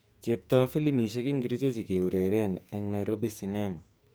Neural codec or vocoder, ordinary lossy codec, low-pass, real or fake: codec, 44.1 kHz, 2.6 kbps, SNAC; none; none; fake